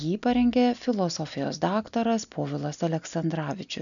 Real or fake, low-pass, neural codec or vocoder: real; 7.2 kHz; none